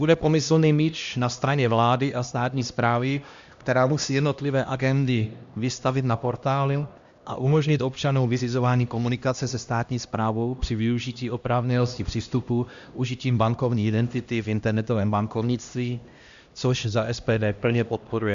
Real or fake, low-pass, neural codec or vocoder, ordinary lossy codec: fake; 7.2 kHz; codec, 16 kHz, 1 kbps, X-Codec, HuBERT features, trained on LibriSpeech; Opus, 64 kbps